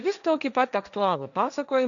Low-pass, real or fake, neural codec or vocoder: 7.2 kHz; fake; codec, 16 kHz, 1.1 kbps, Voila-Tokenizer